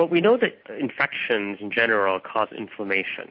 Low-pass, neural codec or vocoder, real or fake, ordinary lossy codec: 5.4 kHz; none; real; MP3, 32 kbps